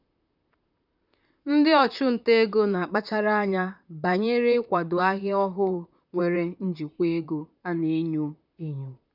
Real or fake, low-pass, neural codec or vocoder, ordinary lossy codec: fake; 5.4 kHz; vocoder, 44.1 kHz, 128 mel bands, Pupu-Vocoder; none